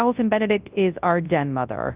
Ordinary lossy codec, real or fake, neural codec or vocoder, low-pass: Opus, 24 kbps; fake; codec, 24 kHz, 0.9 kbps, WavTokenizer, large speech release; 3.6 kHz